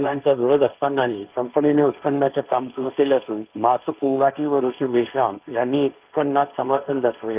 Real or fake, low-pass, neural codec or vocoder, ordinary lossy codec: fake; 3.6 kHz; codec, 16 kHz, 1.1 kbps, Voila-Tokenizer; Opus, 32 kbps